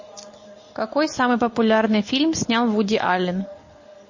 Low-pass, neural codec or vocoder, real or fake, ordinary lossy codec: 7.2 kHz; none; real; MP3, 32 kbps